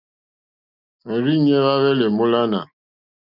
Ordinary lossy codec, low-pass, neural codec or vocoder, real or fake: Opus, 64 kbps; 5.4 kHz; none; real